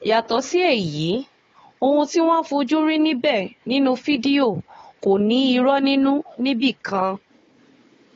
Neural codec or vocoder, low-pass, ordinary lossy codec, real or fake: codec, 16 kHz, 4 kbps, FunCodec, trained on Chinese and English, 50 frames a second; 7.2 kHz; AAC, 32 kbps; fake